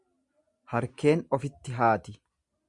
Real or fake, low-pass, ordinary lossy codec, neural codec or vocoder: real; 10.8 kHz; Opus, 64 kbps; none